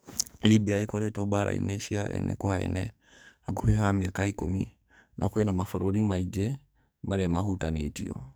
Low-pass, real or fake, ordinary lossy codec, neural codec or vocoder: none; fake; none; codec, 44.1 kHz, 2.6 kbps, SNAC